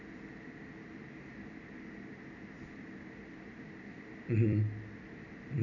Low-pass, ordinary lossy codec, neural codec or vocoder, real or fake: 7.2 kHz; none; vocoder, 44.1 kHz, 128 mel bands every 256 samples, BigVGAN v2; fake